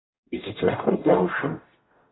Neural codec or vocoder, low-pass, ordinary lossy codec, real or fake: codec, 44.1 kHz, 0.9 kbps, DAC; 7.2 kHz; AAC, 16 kbps; fake